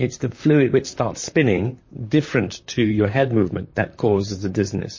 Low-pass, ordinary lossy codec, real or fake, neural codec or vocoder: 7.2 kHz; MP3, 32 kbps; fake; vocoder, 44.1 kHz, 128 mel bands, Pupu-Vocoder